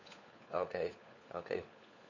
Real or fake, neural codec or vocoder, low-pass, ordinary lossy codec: fake; codec, 16 kHz, 4 kbps, FunCodec, trained on LibriTTS, 50 frames a second; 7.2 kHz; none